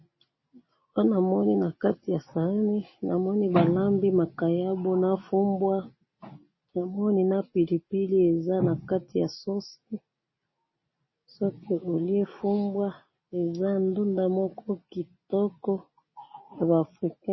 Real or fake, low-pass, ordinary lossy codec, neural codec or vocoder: real; 7.2 kHz; MP3, 24 kbps; none